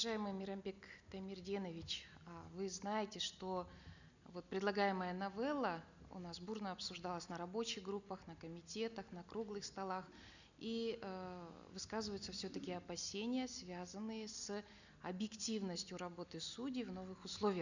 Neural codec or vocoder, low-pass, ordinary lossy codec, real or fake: none; 7.2 kHz; none; real